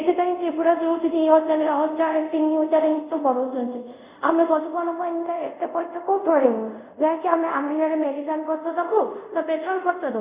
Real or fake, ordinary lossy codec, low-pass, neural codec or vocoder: fake; Opus, 64 kbps; 3.6 kHz; codec, 24 kHz, 0.5 kbps, DualCodec